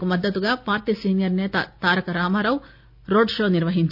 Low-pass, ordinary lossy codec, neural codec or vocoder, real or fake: 5.4 kHz; none; none; real